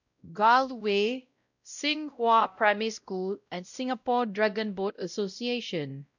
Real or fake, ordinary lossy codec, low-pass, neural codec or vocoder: fake; none; 7.2 kHz; codec, 16 kHz, 0.5 kbps, X-Codec, WavLM features, trained on Multilingual LibriSpeech